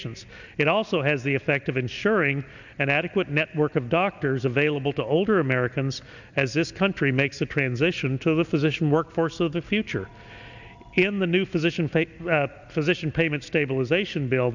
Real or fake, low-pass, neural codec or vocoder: real; 7.2 kHz; none